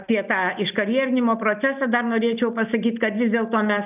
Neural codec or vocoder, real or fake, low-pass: none; real; 3.6 kHz